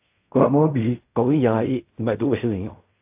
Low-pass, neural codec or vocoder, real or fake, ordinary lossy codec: 3.6 kHz; codec, 16 kHz in and 24 kHz out, 0.4 kbps, LongCat-Audio-Codec, fine tuned four codebook decoder; fake; AAC, 32 kbps